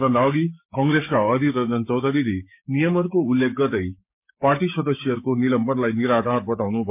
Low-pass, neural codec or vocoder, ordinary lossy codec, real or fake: 3.6 kHz; codec, 16 kHz, 6 kbps, DAC; MP3, 32 kbps; fake